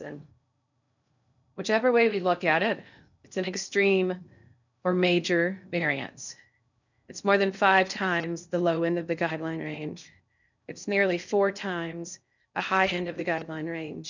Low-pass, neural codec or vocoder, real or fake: 7.2 kHz; codec, 16 kHz in and 24 kHz out, 0.6 kbps, FocalCodec, streaming, 2048 codes; fake